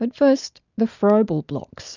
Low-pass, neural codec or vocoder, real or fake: 7.2 kHz; none; real